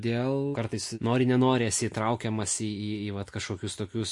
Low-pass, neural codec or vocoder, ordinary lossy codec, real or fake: 10.8 kHz; none; MP3, 48 kbps; real